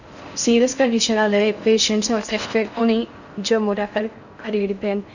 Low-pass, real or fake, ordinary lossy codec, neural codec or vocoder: 7.2 kHz; fake; none; codec, 16 kHz in and 24 kHz out, 0.6 kbps, FocalCodec, streaming, 4096 codes